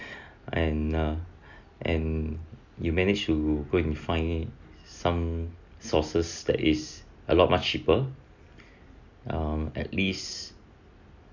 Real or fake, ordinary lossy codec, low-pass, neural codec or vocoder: real; none; 7.2 kHz; none